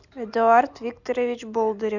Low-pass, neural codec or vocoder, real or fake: 7.2 kHz; none; real